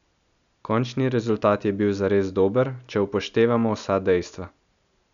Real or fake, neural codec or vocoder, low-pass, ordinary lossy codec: real; none; 7.2 kHz; none